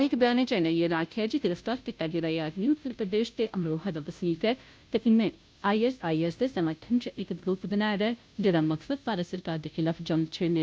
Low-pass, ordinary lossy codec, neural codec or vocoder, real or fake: none; none; codec, 16 kHz, 0.5 kbps, FunCodec, trained on Chinese and English, 25 frames a second; fake